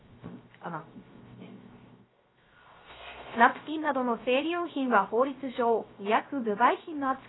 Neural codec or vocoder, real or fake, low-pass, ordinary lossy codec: codec, 16 kHz, 0.3 kbps, FocalCodec; fake; 7.2 kHz; AAC, 16 kbps